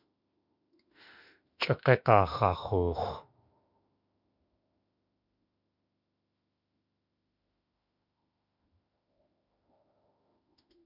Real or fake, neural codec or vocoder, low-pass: fake; autoencoder, 48 kHz, 32 numbers a frame, DAC-VAE, trained on Japanese speech; 5.4 kHz